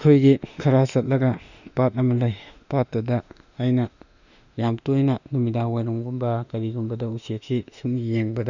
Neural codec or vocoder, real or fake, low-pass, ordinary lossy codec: autoencoder, 48 kHz, 32 numbers a frame, DAC-VAE, trained on Japanese speech; fake; 7.2 kHz; none